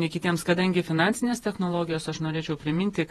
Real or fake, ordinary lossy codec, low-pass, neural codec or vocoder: fake; AAC, 32 kbps; 19.8 kHz; vocoder, 48 kHz, 128 mel bands, Vocos